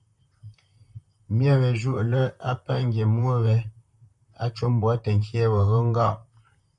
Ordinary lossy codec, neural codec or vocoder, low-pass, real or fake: MP3, 96 kbps; vocoder, 44.1 kHz, 128 mel bands, Pupu-Vocoder; 10.8 kHz; fake